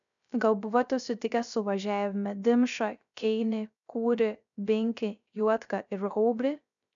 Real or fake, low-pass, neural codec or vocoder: fake; 7.2 kHz; codec, 16 kHz, 0.3 kbps, FocalCodec